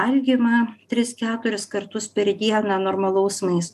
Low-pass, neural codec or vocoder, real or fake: 14.4 kHz; none; real